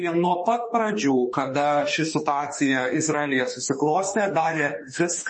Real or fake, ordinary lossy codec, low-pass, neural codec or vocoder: fake; MP3, 32 kbps; 10.8 kHz; autoencoder, 48 kHz, 32 numbers a frame, DAC-VAE, trained on Japanese speech